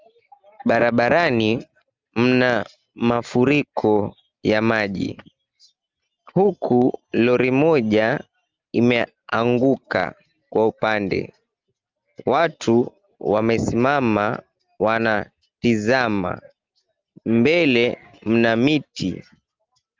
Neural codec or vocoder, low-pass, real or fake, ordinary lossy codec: none; 7.2 kHz; real; Opus, 32 kbps